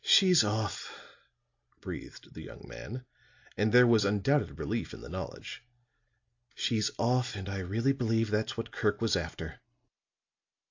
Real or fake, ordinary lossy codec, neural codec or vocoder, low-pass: real; AAC, 48 kbps; none; 7.2 kHz